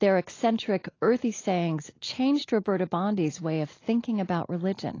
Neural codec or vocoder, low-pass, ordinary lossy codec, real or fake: none; 7.2 kHz; AAC, 32 kbps; real